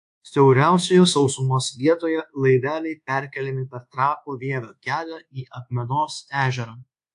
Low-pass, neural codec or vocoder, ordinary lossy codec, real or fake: 10.8 kHz; codec, 24 kHz, 1.2 kbps, DualCodec; AAC, 64 kbps; fake